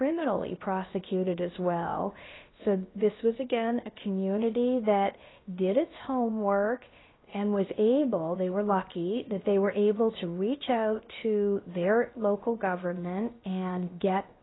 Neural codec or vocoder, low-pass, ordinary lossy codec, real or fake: codec, 16 kHz, about 1 kbps, DyCAST, with the encoder's durations; 7.2 kHz; AAC, 16 kbps; fake